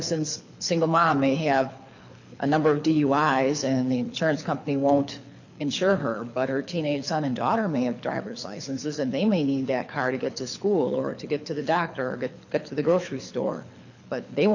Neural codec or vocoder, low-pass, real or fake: codec, 24 kHz, 6 kbps, HILCodec; 7.2 kHz; fake